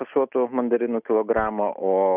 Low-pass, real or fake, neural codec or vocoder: 3.6 kHz; real; none